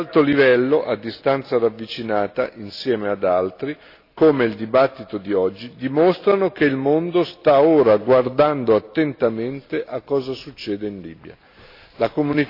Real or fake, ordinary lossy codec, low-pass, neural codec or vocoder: real; AAC, 32 kbps; 5.4 kHz; none